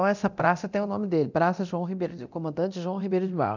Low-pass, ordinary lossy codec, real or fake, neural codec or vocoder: 7.2 kHz; none; fake; codec, 24 kHz, 0.9 kbps, DualCodec